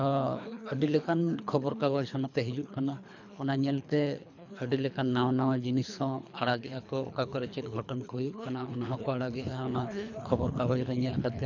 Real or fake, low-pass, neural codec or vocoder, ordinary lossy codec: fake; 7.2 kHz; codec, 24 kHz, 3 kbps, HILCodec; none